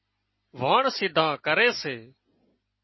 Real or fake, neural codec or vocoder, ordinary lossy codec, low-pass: real; none; MP3, 24 kbps; 7.2 kHz